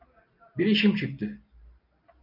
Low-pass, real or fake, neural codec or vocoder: 5.4 kHz; real; none